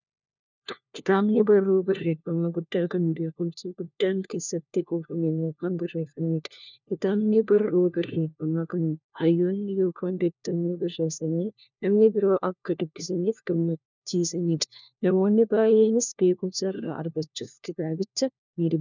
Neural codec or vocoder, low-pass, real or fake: codec, 16 kHz, 1 kbps, FunCodec, trained on LibriTTS, 50 frames a second; 7.2 kHz; fake